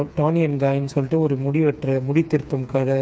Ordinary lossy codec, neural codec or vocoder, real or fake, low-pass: none; codec, 16 kHz, 4 kbps, FreqCodec, smaller model; fake; none